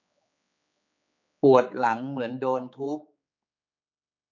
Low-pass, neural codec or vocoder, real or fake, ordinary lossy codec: 7.2 kHz; codec, 16 kHz, 4 kbps, X-Codec, HuBERT features, trained on general audio; fake; none